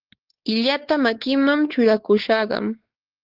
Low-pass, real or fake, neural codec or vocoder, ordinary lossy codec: 5.4 kHz; fake; codec, 16 kHz, 4 kbps, X-Codec, WavLM features, trained on Multilingual LibriSpeech; Opus, 16 kbps